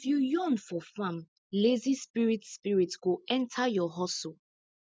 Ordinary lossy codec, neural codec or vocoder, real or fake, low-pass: none; none; real; none